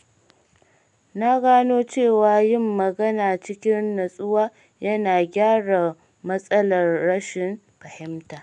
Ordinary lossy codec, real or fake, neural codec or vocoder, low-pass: none; real; none; 10.8 kHz